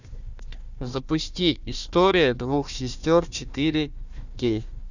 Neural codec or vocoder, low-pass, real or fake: codec, 16 kHz, 1 kbps, FunCodec, trained on Chinese and English, 50 frames a second; 7.2 kHz; fake